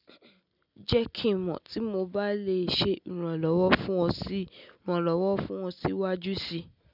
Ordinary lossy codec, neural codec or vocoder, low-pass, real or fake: none; none; 5.4 kHz; real